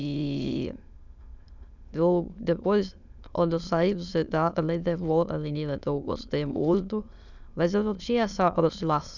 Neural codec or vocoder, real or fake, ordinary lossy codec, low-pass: autoencoder, 22.05 kHz, a latent of 192 numbers a frame, VITS, trained on many speakers; fake; none; 7.2 kHz